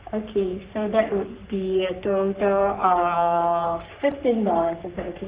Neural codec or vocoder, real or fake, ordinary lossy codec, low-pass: codec, 44.1 kHz, 3.4 kbps, Pupu-Codec; fake; Opus, 24 kbps; 3.6 kHz